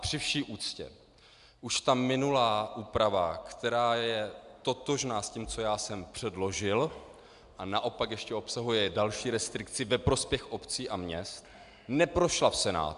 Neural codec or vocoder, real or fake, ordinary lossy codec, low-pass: none; real; AAC, 96 kbps; 10.8 kHz